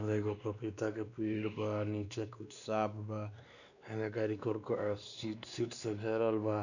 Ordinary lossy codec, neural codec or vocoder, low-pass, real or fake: none; codec, 16 kHz, 2 kbps, X-Codec, WavLM features, trained on Multilingual LibriSpeech; 7.2 kHz; fake